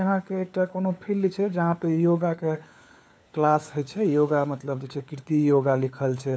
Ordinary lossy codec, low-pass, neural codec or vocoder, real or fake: none; none; codec, 16 kHz, 4 kbps, FunCodec, trained on LibriTTS, 50 frames a second; fake